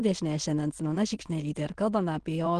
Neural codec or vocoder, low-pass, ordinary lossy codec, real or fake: autoencoder, 22.05 kHz, a latent of 192 numbers a frame, VITS, trained on many speakers; 9.9 kHz; Opus, 16 kbps; fake